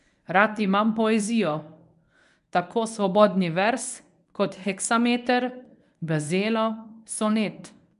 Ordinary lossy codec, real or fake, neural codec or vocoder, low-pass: none; fake; codec, 24 kHz, 0.9 kbps, WavTokenizer, medium speech release version 1; 10.8 kHz